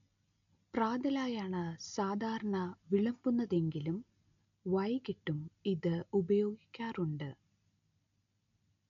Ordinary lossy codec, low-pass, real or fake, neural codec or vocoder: none; 7.2 kHz; real; none